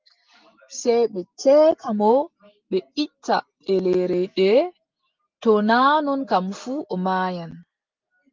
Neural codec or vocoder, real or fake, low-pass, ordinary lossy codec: none; real; 7.2 kHz; Opus, 24 kbps